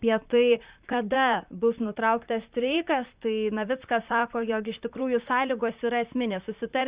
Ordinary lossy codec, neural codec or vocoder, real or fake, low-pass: Opus, 64 kbps; vocoder, 44.1 kHz, 128 mel bands, Pupu-Vocoder; fake; 3.6 kHz